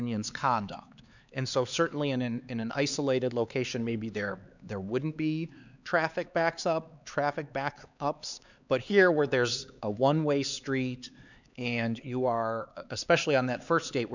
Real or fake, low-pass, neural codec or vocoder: fake; 7.2 kHz; codec, 16 kHz, 4 kbps, X-Codec, HuBERT features, trained on LibriSpeech